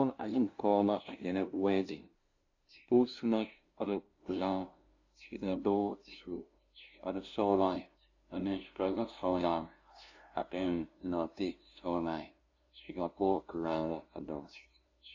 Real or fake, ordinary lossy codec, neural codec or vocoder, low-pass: fake; AAC, 48 kbps; codec, 16 kHz, 0.5 kbps, FunCodec, trained on LibriTTS, 25 frames a second; 7.2 kHz